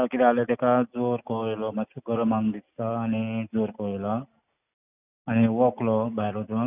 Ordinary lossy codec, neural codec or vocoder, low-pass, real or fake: none; none; 3.6 kHz; real